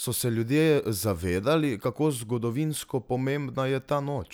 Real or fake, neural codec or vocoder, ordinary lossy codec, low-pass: real; none; none; none